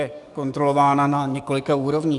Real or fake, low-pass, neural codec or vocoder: fake; 10.8 kHz; codec, 44.1 kHz, 7.8 kbps, DAC